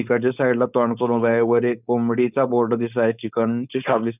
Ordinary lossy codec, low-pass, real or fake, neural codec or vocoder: none; 3.6 kHz; fake; codec, 16 kHz, 4.8 kbps, FACodec